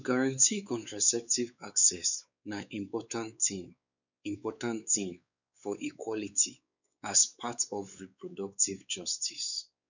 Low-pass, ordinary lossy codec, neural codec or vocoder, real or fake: 7.2 kHz; none; codec, 16 kHz, 4 kbps, X-Codec, WavLM features, trained on Multilingual LibriSpeech; fake